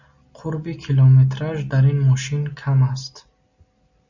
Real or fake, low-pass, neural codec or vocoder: real; 7.2 kHz; none